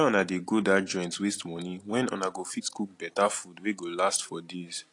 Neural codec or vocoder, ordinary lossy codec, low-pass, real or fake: none; AAC, 48 kbps; 10.8 kHz; real